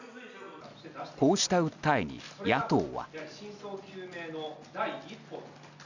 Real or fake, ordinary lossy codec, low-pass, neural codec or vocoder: real; none; 7.2 kHz; none